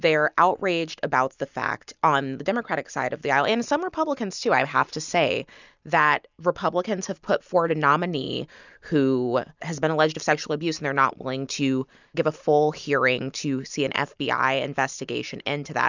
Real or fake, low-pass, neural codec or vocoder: real; 7.2 kHz; none